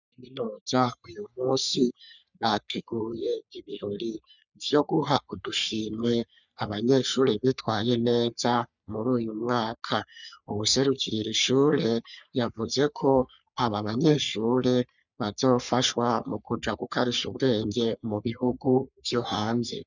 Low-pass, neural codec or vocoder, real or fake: 7.2 kHz; codec, 44.1 kHz, 3.4 kbps, Pupu-Codec; fake